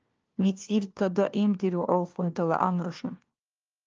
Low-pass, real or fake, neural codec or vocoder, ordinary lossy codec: 7.2 kHz; fake; codec, 16 kHz, 1 kbps, FunCodec, trained on LibriTTS, 50 frames a second; Opus, 24 kbps